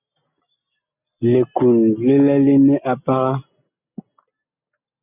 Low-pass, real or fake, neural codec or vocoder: 3.6 kHz; real; none